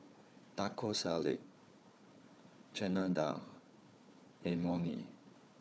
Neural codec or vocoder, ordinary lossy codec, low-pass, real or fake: codec, 16 kHz, 4 kbps, FunCodec, trained on Chinese and English, 50 frames a second; none; none; fake